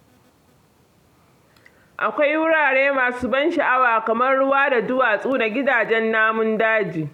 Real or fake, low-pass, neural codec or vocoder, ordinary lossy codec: real; 19.8 kHz; none; none